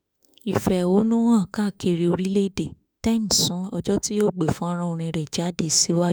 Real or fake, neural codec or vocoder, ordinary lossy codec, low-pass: fake; autoencoder, 48 kHz, 32 numbers a frame, DAC-VAE, trained on Japanese speech; none; none